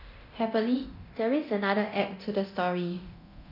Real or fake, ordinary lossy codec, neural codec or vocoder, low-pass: fake; none; codec, 24 kHz, 0.9 kbps, DualCodec; 5.4 kHz